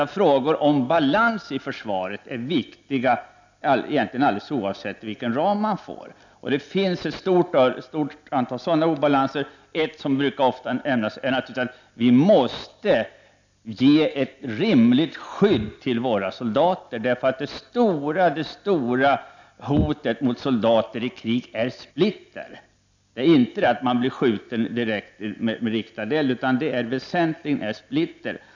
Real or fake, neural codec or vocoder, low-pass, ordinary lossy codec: real; none; 7.2 kHz; none